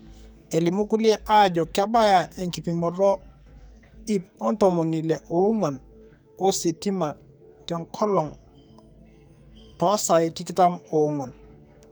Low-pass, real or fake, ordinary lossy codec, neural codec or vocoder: none; fake; none; codec, 44.1 kHz, 2.6 kbps, SNAC